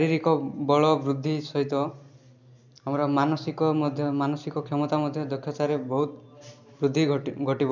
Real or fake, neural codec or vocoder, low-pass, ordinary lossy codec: real; none; 7.2 kHz; none